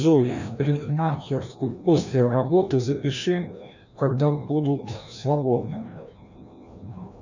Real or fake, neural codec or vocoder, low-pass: fake; codec, 16 kHz, 1 kbps, FreqCodec, larger model; 7.2 kHz